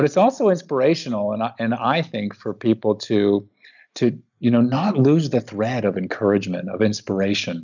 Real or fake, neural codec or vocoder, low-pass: real; none; 7.2 kHz